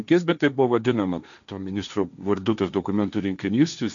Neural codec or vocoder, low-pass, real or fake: codec, 16 kHz, 1.1 kbps, Voila-Tokenizer; 7.2 kHz; fake